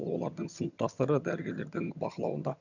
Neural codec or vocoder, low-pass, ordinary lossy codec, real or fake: vocoder, 22.05 kHz, 80 mel bands, HiFi-GAN; 7.2 kHz; none; fake